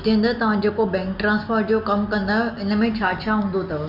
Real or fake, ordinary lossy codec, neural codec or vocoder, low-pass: real; Opus, 64 kbps; none; 5.4 kHz